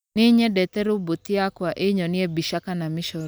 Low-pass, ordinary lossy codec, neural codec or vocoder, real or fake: none; none; none; real